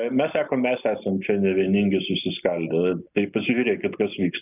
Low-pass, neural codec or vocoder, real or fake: 3.6 kHz; none; real